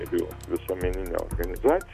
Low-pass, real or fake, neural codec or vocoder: 14.4 kHz; real; none